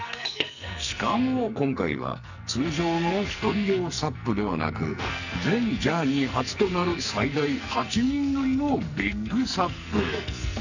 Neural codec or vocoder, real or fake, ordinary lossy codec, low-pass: codec, 44.1 kHz, 2.6 kbps, SNAC; fake; none; 7.2 kHz